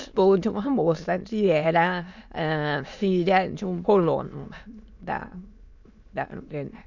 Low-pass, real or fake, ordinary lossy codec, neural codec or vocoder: 7.2 kHz; fake; none; autoencoder, 22.05 kHz, a latent of 192 numbers a frame, VITS, trained on many speakers